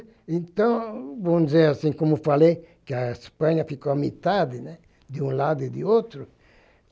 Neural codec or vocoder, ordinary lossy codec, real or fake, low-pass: none; none; real; none